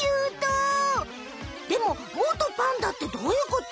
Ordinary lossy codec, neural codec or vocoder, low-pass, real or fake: none; none; none; real